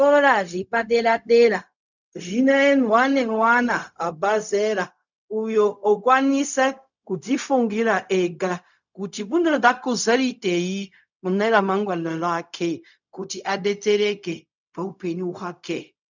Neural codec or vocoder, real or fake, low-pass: codec, 16 kHz, 0.4 kbps, LongCat-Audio-Codec; fake; 7.2 kHz